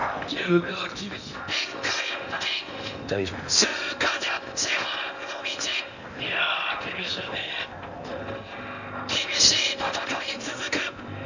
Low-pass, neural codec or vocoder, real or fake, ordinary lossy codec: 7.2 kHz; codec, 16 kHz in and 24 kHz out, 0.8 kbps, FocalCodec, streaming, 65536 codes; fake; none